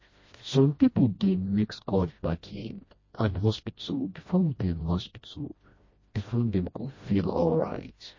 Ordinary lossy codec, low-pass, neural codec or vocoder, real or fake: MP3, 32 kbps; 7.2 kHz; codec, 16 kHz, 1 kbps, FreqCodec, smaller model; fake